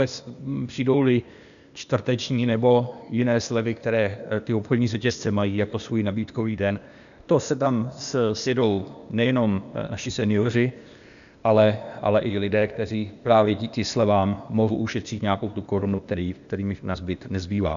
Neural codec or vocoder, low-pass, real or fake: codec, 16 kHz, 0.8 kbps, ZipCodec; 7.2 kHz; fake